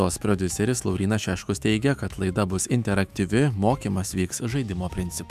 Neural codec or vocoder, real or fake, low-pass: autoencoder, 48 kHz, 128 numbers a frame, DAC-VAE, trained on Japanese speech; fake; 14.4 kHz